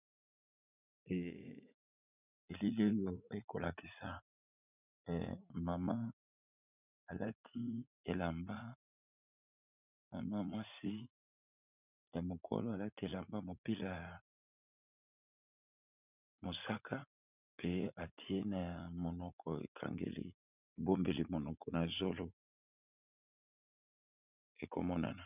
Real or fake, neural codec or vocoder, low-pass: fake; vocoder, 44.1 kHz, 80 mel bands, Vocos; 3.6 kHz